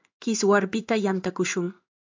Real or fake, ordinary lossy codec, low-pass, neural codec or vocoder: fake; MP3, 64 kbps; 7.2 kHz; codec, 16 kHz in and 24 kHz out, 1 kbps, XY-Tokenizer